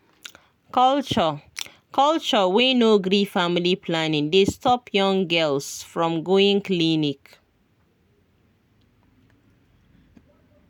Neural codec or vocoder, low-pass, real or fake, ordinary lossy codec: none; 19.8 kHz; real; none